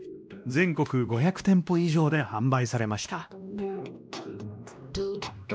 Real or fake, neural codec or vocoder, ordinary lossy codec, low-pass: fake; codec, 16 kHz, 1 kbps, X-Codec, WavLM features, trained on Multilingual LibriSpeech; none; none